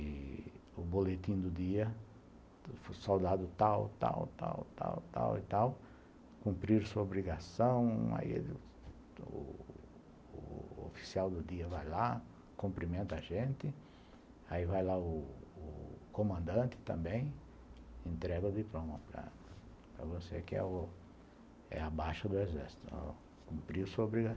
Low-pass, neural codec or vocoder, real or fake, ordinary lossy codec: none; none; real; none